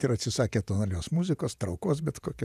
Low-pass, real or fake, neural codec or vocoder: 14.4 kHz; fake; vocoder, 48 kHz, 128 mel bands, Vocos